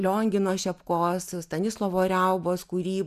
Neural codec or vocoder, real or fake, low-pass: none; real; 14.4 kHz